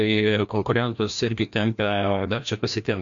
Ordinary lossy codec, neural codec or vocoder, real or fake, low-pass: MP3, 64 kbps; codec, 16 kHz, 1 kbps, FreqCodec, larger model; fake; 7.2 kHz